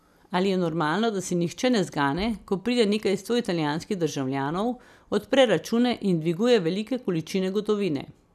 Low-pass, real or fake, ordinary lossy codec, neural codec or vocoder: 14.4 kHz; real; none; none